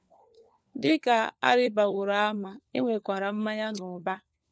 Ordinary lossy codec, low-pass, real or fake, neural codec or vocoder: none; none; fake; codec, 16 kHz, 4 kbps, FunCodec, trained on LibriTTS, 50 frames a second